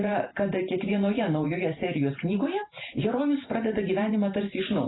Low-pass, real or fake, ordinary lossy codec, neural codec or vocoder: 7.2 kHz; fake; AAC, 16 kbps; vocoder, 44.1 kHz, 128 mel bands every 512 samples, BigVGAN v2